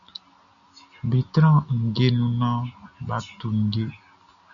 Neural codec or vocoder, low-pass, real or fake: none; 7.2 kHz; real